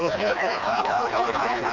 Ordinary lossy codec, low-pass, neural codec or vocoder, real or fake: none; 7.2 kHz; codec, 16 kHz, 2 kbps, FreqCodec, smaller model; fake